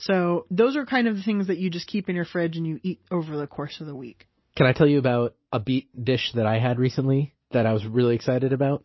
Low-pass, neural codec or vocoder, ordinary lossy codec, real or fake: 7.2 kHz; none; MP3, 24 kbps; real